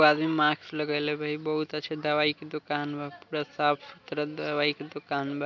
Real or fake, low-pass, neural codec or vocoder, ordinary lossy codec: real; 7.2 kHz; none; none